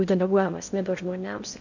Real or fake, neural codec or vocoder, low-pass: fake; codec, 16 kHz in and 24 kHz out, 0.6 kbps, FocalCodec, streaming, 2048 codes; 7.2 kHz